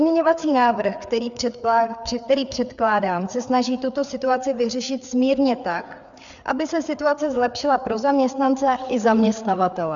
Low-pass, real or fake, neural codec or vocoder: 7.2 kHz; fake; codec, 16 kHz, 4 kbps, FreqCodec, larger model